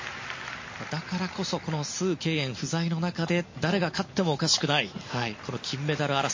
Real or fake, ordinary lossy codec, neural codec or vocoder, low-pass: real; MP3, 32 kbps; none; 7.2 kHz